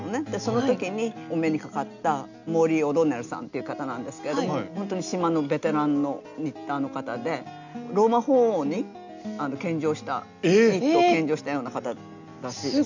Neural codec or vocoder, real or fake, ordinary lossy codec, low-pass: none; real; none; 7.2 kHz